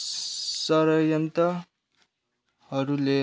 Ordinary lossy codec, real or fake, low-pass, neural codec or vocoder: none; real; none; none